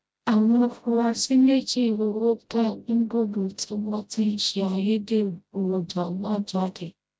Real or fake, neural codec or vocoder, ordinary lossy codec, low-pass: fake; codec, 16 kHz, 0.5 kbps, FreqCodec, smaller model; none; none